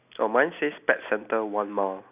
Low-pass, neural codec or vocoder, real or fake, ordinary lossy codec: 3.6 kHz; none; real; none